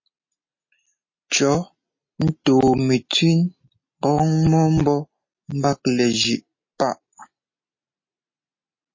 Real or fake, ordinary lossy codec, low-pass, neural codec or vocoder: real; MP3, 32 kbps; 7.2 kHz; none